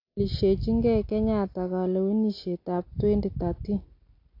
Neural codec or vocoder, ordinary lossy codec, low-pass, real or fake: none; AAC, 32 kbps; 5.4 kHz; real